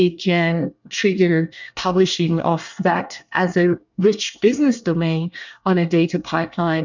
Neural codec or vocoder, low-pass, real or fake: codec, 24 kHz, 1 kbps, SNAC; 7.2 kHz; fake